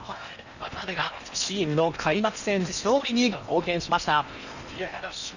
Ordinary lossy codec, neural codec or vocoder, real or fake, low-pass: none; codec, 16 kHz in and 24 kHz out, 0.8 kbps, FocalCodec, streaming, 65536 codes; fake; 7.2 kHz